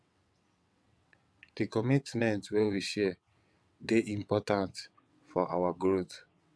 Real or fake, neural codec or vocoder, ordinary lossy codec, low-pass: fake; vocoder, 22.05 kHz, 80 mel bands, WaveNeXt; none; none